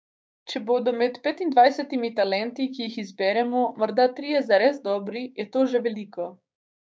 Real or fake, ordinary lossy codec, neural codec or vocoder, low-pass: fake; none; codec, 16 kHz, 6 kbps, DAC; none